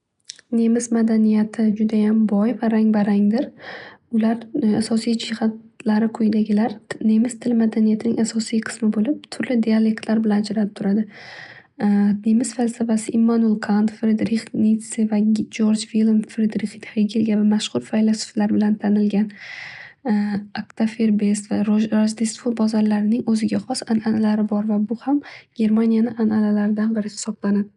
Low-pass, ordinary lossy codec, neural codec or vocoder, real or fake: 10.8 kHz; none; none; real